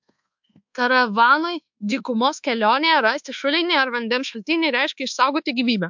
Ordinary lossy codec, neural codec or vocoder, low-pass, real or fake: MP3, 64 kbps; codec, 24 kHz, 1.2 kbps, DualCodec; 7.2 kHz; fake